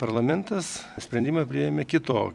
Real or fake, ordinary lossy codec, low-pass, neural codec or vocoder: fake; MP3, 96 kbps; 10.8 kHz; vocoder, 44.1 kHz, 128 mel bands every 256 samples, BigVGAN v2